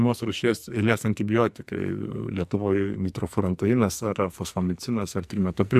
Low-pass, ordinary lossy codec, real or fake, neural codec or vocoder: 14.4 kHz; MP3, 96 kbps; fake; codec, 44.1 kHz, 2.6 kbps, SNAC